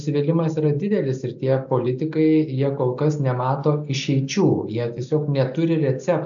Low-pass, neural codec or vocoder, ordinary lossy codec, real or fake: 7.2 kHz; none; AAC, 64 kbps; real